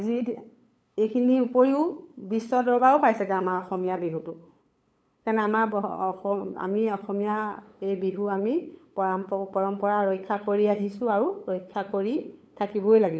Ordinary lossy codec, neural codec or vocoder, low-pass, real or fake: none; codec, 16 kHz, 8 kbps, FunCodec, trained on LibriTTS, 25 frames a second; none; fake